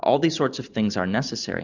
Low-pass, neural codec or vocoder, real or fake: 7.2 kHz; none; real